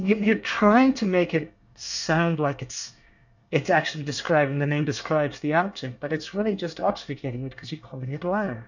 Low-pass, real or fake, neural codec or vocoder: 7.2 kHz; fake; codec, 24 kHz, 1 kbps, SNAC